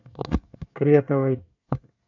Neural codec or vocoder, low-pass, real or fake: codec, 24 kHz, 1 kbps, SNAC; 7.2 kHz; fake